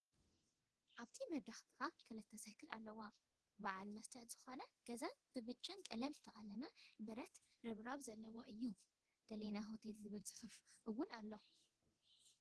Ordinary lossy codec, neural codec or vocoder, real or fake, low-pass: Opus, 16 kbps; codec, 24 kHz, 0.9 kbps, DualCodec; fake; 10.8 kHz